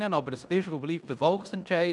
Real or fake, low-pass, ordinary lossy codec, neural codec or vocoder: fake; 10.8 kHz; none; codec, 16 kHz in and 24 kHz out, 0.9 kbps, LongCat-Audio-Codec, fine tuned four codebook decoder